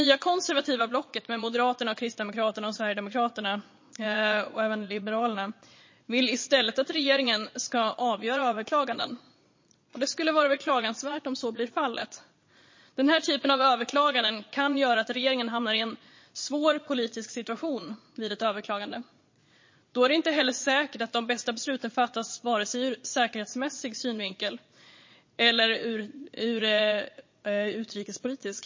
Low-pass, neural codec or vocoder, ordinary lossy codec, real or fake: 7.2 kHz; vocoder, 22.05 kHz, 80 mel bands, Vocos; MP3, 32 kbps; fake